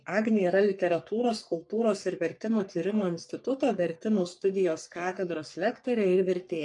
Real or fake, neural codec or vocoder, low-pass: fake; codec, 44.1 kHz, 3.4 kbps, Pupu-Codec; 10.8 kHz